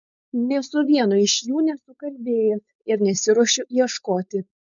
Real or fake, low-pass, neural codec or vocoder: fake; 7.2 kHz; codec, 16 kHz, 4.8 kbps, FACodec